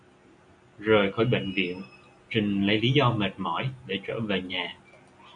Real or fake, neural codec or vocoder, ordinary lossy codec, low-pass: real; none; AAC, 64 kbps; 9.9 kHz